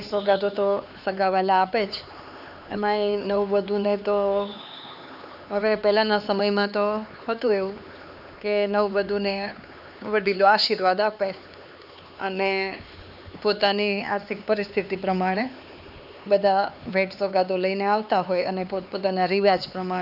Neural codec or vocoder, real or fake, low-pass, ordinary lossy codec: codec, 16 kHz, 4 kbps, X-Codec, HuBERT features, trained on LibriSpeech; fake; 5.4 kHz; none